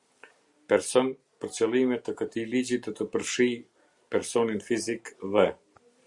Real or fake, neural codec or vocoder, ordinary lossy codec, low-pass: real; none; Opus, 64 kbps; 10.8 kHz